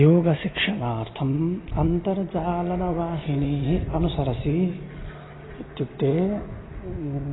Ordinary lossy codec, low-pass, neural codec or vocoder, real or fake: AAC, 16 kbps; 7.2 kHz; none; real